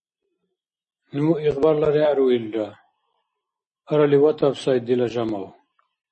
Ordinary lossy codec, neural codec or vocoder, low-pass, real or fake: MP3, 32 kbps; none; 10.8 kHz; real